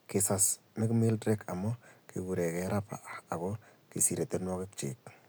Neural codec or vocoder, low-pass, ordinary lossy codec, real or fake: none; none; none; real